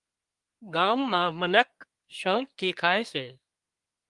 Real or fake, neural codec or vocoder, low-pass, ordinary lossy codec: fake; codec, 24 kHz, 1 kbps, SNAC; 10.8 kHz; Opus, 32 kbps